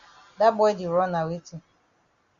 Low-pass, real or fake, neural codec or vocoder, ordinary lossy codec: 7.2 kHz; real; none; Opus, 64 kbps